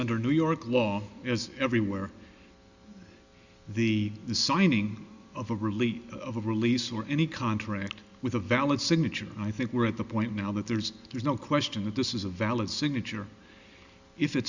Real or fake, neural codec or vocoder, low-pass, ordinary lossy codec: real; none; 7.2 kHz; Opus, 64 kbps